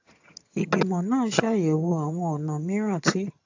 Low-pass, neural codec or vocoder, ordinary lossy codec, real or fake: 7.2 kHz; vocoder, 22.05 kHz, 80 mel bands, HiFi-GAN; AAC, 48 kbps; fake